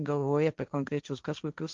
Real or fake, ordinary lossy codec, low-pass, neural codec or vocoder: fake; Opus, 24 kbps; 7.2 kHz; codec, 16 kHz, 1.1 kbps, Voila-Tokenizer